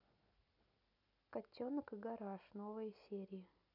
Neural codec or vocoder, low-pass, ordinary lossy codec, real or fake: none; 5.4 kHz; none; real